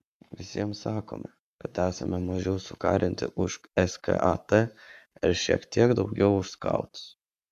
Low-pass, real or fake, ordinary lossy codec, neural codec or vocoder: 14.4 kHz; fake; MP3, 96 kbps; codec, 44.1 kHz, 7.8 kbps, Pupu-Codec